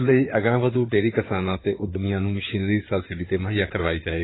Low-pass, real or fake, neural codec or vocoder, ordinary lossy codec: 7.2 kHz; fake; codec, 16 kHz in and 24 kHz out, 2.2 kbps, FireRedTTS-2 codec; AAC, 16 kbps